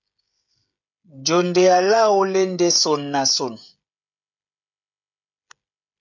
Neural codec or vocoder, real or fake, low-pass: codec, 16 kHz, 16 kbps, FreqCodec, smaller model; fake; 7.2 kHz